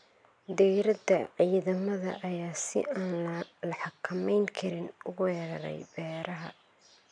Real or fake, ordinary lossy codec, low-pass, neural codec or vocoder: real; none; 9.9 kHz; none